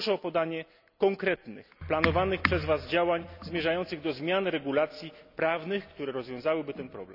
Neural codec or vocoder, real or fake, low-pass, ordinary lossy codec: none; real; 5.4 kHz; none